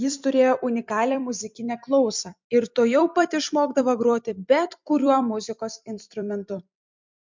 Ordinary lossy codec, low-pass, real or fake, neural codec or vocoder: MP3, 64 kbps; 7.2 kHz; fake; vocoder, 44.1 kHz, 128 mel bands every 256 samples, BigVGAN v2